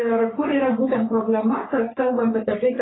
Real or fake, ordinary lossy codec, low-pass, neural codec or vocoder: fake; AAC, 16 kbps; 7.2 kHz; codec, 44.1 kHz, 3.4 kbps, Pupu-Codec